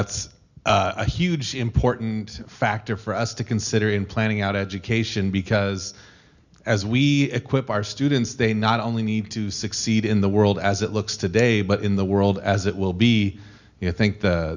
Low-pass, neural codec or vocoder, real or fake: 7.2 kHz; none; real